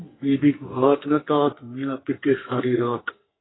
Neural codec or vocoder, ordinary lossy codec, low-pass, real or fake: codec, 44.1 kHz, 2.6 kbps, SNAC; AAC, 16 kbps; 7.2 kHz; fake